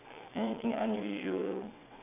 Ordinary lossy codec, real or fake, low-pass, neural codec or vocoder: none; fake; 3.6 kHz; vocoder, 22.05 kHz, 80 mel bands, WaveNeXt